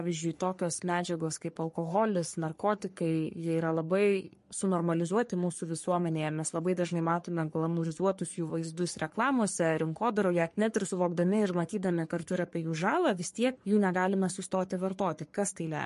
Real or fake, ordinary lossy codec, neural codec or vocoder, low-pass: fake; MP3, 48 kbps; codec, 44.1 kHz, 3.4 kbps, Pupu-Codec; 14.4 kHz